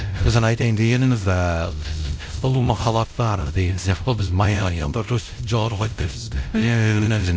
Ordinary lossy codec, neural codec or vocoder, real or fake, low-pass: none; codec, 16 kHz, 0.5 kbps, X-Codec, WavLM features, trained on Multilingual LibriSpeech; fake; none